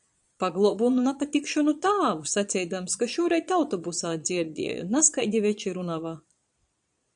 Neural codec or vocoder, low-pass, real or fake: vocoder, 22.05 kHz, 80 mel bands, Vocos; 9.9 kHz; fake